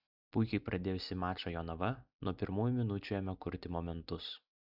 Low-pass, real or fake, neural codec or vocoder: 5.4 kHz; real; none